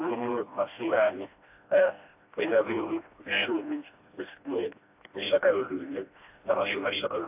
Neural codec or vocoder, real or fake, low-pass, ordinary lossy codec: codec, 16 kHz, 1 kbps, FreqCodec, smaller model; fake; 3.6 kHz; AAC, 32 kbps